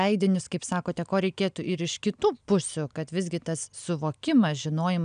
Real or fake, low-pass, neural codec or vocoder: real; 9.9 kHz; none